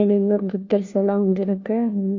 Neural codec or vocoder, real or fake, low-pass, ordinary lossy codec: codec, 16 kHz, 1 kbps, FunCodec, trained on LibriTTS, 50 frames a second; fake; 7.2 kHz; none